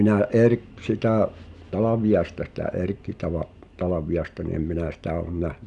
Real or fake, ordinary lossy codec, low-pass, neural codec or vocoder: real; none; 10.8 kHz; none